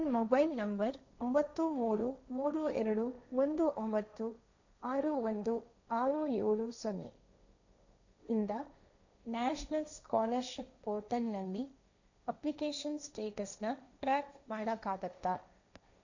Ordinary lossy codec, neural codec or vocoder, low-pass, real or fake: none; codec, 16 kHz, 1.1 kbps, Voila-Tokenizer; 7.2 kHz; fake